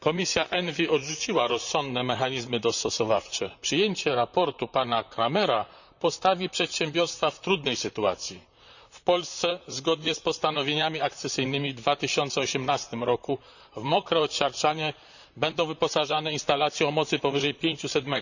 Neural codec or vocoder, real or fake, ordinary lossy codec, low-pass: vocoder, 44.1 kHz, 128 mel bands, Pupu-Vocoder; fake; none; 7.2 kHz